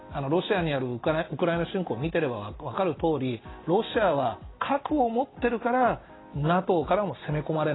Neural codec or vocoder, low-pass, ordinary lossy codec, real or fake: none; 7.2 kHz; AAC, 16 kbps; real